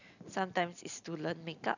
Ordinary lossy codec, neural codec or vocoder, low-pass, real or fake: none; none; 7.2 kHz; real